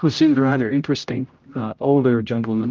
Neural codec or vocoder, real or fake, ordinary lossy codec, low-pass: codec, 16 kHz, 0.5 kbps, X-Codec, HuBERT features, trained on general audio; fake; Opus, 24 kbps; 7.2 kHz